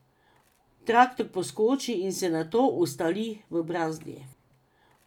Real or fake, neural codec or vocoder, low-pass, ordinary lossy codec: real; none; 19.8 kHz; none